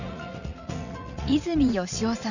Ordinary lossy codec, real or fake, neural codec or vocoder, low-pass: none; fake; vocoder, 44.1 kHz, 80 mel bands, Vocos; 7.2 kHz